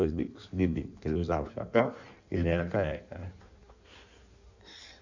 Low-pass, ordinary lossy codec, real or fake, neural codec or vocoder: 7.2 kHz; none; fake; codec, 16 kHz in and 24 kHz out, 1.1 kbps, FireRedTTS-2 codec